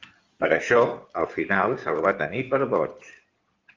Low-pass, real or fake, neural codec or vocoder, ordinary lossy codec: 7.2 kHz; fake; codec, 16 kHz in and 24 kHz out, 2.2 kbps, FireRedTTS-2 codec; Opus, 32 kbps